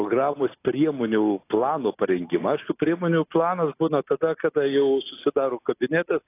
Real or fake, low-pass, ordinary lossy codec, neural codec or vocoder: real; 3.6 kHz; AAC, 24 kbps; none